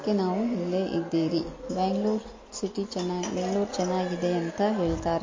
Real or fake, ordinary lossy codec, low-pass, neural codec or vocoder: real; MP3, 32 kbps; 7.2 kHz; none